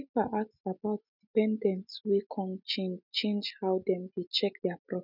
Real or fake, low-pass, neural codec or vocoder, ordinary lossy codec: real; 5.4 kHz; none; none